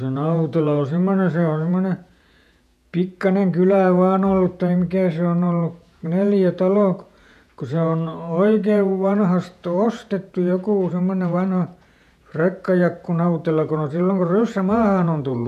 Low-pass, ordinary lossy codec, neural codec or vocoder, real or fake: 14.4 kHz; none; vocoder, 48 kHz, 128 mel bands, Vocos; fake